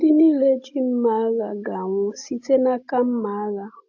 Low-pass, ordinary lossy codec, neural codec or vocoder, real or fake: 7.2 kHz; AAC, 48 kbps; none; real